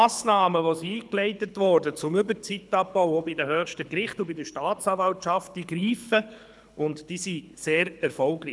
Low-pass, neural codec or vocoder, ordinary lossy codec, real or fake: none; codec, 24 kHz, 6 kbps, HILCodec; none; fake